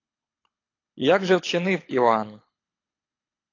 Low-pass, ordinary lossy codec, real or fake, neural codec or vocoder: 7.2 kHz; AAC, 32 kbps; fake; codec, 24 kHz, 6 kbps, HILCodec